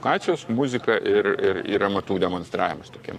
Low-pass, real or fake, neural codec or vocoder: 14.4 kHz; fake; codec, 44.1 kHz, 7.8 kbps, Pupu-Codec